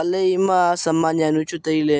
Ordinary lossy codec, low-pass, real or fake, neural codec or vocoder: none; none; real; none